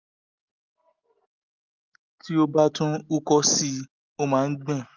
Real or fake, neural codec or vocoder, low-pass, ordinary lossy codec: real; none; 7.2 kHz; Opus, 32 kbps